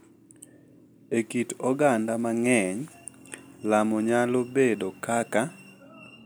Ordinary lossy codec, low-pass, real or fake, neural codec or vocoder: none; none; real; none